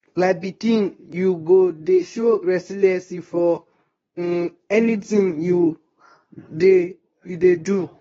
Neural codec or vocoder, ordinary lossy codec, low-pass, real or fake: codec, 24 kHz, 0.9 kbps, WavTokenizer, medium speech release version 2; AAC, 24 kbps; 10.8 kHz; fake